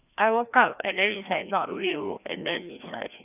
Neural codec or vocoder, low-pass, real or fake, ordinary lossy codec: codec, 16 kHz, 1 kbps, FreqCodec, larger model; 3.6 kHz; fake; none